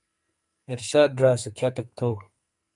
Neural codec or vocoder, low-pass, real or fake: codec, 44.1 kHz, 2.6 kbps, SNAC; 10.8 kHz; fake